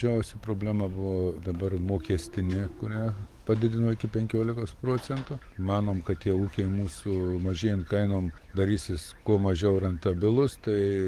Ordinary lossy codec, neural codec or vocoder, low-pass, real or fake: Opus, 24 kbps; codec, 44.1 kHz, 7.8 kbps, DAC; 14.4 kHz; fake